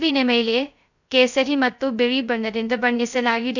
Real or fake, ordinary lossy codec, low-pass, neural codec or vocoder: fake; none; 7.2 kHz; codec, 16 kHz, 0.2 kbps, FocalCodec